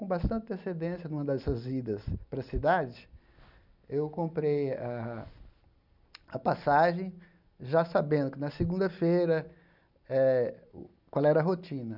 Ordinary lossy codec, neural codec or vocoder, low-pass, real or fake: none; none; 5.4 kHz; real